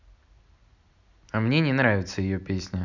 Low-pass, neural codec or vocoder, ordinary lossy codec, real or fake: 7.2 kHz; none; none; real